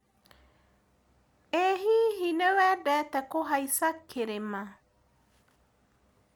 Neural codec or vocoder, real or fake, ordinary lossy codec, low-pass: none; real; none; none